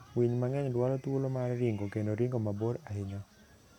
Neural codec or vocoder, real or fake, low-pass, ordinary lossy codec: none; real; 19.8 kHz; none